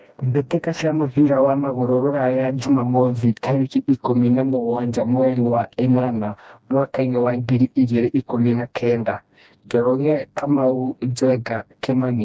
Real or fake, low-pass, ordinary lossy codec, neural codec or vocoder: fake; none; none; codec, 16 kHz, 1 kbps, FreqCodec, smaller model